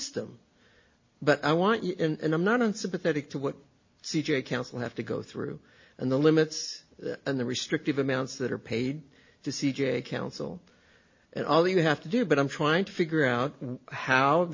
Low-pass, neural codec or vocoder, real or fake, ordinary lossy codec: 7.2 kHz; none; real; MP3, 32 kbps